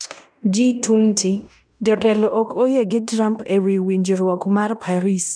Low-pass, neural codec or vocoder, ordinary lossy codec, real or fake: 9.9 kHz; codec, 16 kHz in and 24 kHz out, 0.9 kbps, LongCat-Audio-Codec, fine tuned four codebook decoder; none; fake